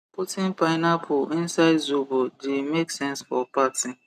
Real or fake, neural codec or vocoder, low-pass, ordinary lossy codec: real; none; 14.4 kHz; none